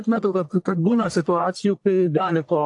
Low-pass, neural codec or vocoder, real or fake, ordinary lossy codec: 10.8 kHz; codec, 44.1 kHz, 1.7 kbps, Pupu-Codec; fake; AAC, 64 kbps